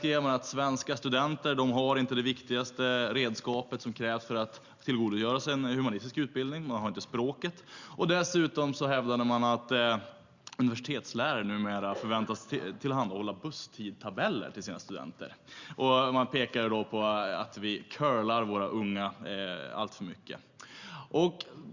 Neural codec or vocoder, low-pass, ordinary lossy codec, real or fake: none; 7.2 kHz; Opus, 64 kbps; real